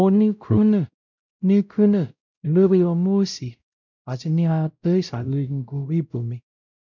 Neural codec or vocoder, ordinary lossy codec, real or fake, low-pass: codec, 16 kHz, 0.5 kbps, X-Codec, WavLM features, trained on Multilingual LibriSpeech; none; fake; 7.2 kHz